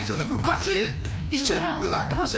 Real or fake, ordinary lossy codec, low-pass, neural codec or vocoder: fake; none; none; codec, 16 kHz, 1 kbps, FreqCodec, larger model